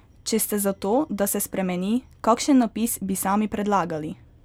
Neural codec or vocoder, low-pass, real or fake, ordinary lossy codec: none; none; real; none